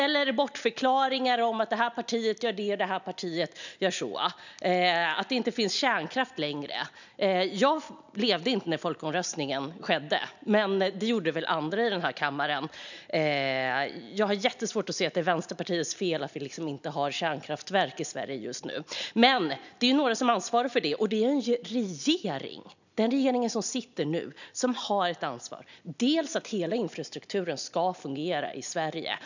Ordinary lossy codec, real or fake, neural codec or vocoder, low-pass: none; real; none; 7.2 kHz